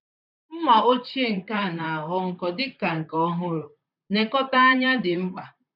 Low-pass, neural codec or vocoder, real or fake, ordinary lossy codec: 5.4 kHz; vocoder, 44.1 kHz, 128 mel bands, Pupu-Vocoder; fake; none